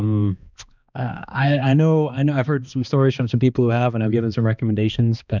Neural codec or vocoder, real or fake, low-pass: codec, 16 kHz, 2 kbps, X-Codec, HuBERT features, trained on balanced general audio; fake; 7.2 kHz